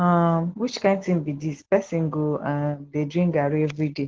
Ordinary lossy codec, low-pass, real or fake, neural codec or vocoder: Opus, 16 kbps; 7.2 kHz; real; none